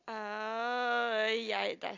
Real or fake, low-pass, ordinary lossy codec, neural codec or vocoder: real; 7.2 kHz; AAC, 48 kbps; none